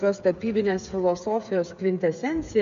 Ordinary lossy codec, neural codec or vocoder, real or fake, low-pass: MP3, 64 kbps; codec, 16 kHz, 16 kbps, FreqCodec, smaller model; fake; 7.2 kHz